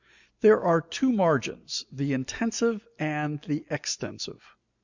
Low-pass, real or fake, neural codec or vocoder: 7.2 kHz; real; none